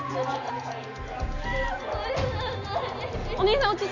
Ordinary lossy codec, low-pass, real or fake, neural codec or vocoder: none; 7.2 kHz; real; none